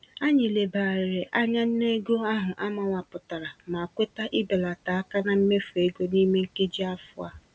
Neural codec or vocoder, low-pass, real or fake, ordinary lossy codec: none; none; real; none